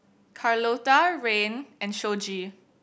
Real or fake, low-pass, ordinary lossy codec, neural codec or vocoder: real; none; none; none